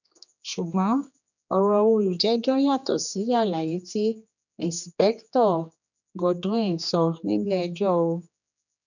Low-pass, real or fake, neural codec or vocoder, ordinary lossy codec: 7.2 kHz; fake; codec, 16 kHz, 2 kbps, X-Codec, HuBERT features, trained on general audio; none